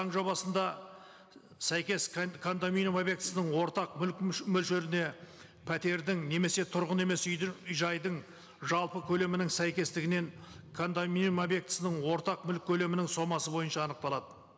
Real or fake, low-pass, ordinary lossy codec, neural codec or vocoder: real; none; none; none